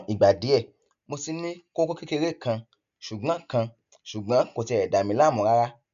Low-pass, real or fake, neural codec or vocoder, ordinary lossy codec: 7.2 kHz; real; none; none